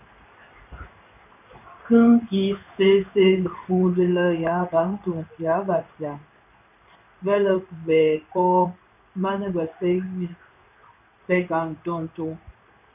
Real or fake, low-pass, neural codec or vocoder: fake; 3.6 kHz; codec, 16 kHz in and 24 kHz out, 1 kbps, XY-Tokenizer